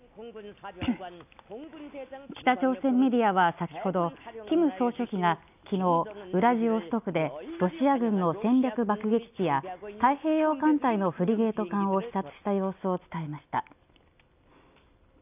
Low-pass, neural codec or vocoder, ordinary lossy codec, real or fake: 3.6 kHz; none; none; real